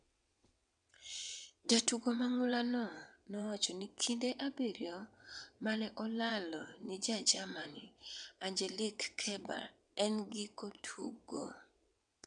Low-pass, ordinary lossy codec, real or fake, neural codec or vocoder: 9.9 kHz; MP3, 96 kbps; fake; vocoder, 22.05 kHz, 80 mel bands, WaveNeXt